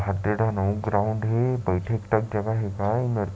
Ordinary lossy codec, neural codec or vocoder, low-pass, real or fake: none; none; none; real